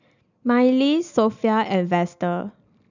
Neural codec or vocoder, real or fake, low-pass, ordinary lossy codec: none; real; 7.2 kHz; none